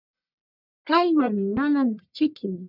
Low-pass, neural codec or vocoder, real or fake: 5.4 kHz; codec, 44.1 kHz, 1.7 kbps, Pupu-Codec; fake